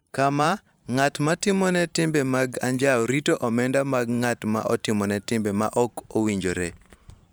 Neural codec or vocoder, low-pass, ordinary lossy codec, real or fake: vocoder, 44.1 kHz, 128 mel bands every 512 samples, BigVGAN v2; none; none; fake